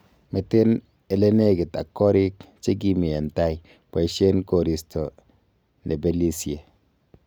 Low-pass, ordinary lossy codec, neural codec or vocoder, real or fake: none; none; none; real